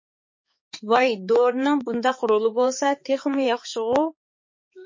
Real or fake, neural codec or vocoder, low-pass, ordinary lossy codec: fake; codec, 16 kHz, 4 kbps, X-Codec, HuBERT features, trained on balanced general audio; 7.2 kHz; MP3, 32 kbps